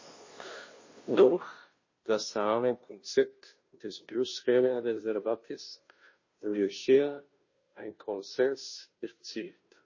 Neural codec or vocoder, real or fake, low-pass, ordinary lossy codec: codec, 16 kHz, 0.5 kbps, FunCodec, trained on Chinese and English, 25 frames a second; fake; 7.2 kHz; MP3, 32 kbps